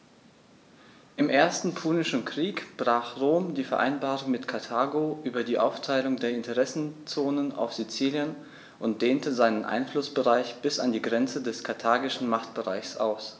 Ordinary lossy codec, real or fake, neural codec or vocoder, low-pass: none; real; none; none